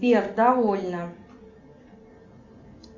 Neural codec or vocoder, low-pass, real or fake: none; 7.2 kHz; real